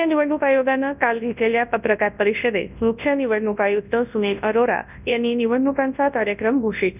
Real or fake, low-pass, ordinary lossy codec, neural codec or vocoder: fake; 3.6 kHz; none; codec, 24 kHz, 0.9 kbps, WavTokenizer, large speech release